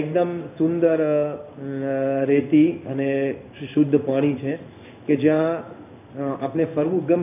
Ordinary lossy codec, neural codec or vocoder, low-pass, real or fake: none; codec, 16 kHz in and 24 kHz out, 1 kbps, XY-Tokenizer; 3.6 kHz; fake